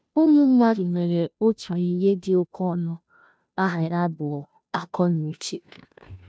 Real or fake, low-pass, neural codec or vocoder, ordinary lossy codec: fake; none; codec, 16 kHz, 0.5 kbps, FunCodec, trained on Chinese and English, 25 frames a second; none